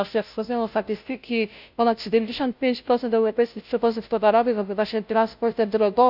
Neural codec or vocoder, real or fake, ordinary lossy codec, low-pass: codec, 16 kHz, 0.5 kbps, FunCodec, trained on Chinese and English, 25 frames a second; fake; none; 5.4 kHz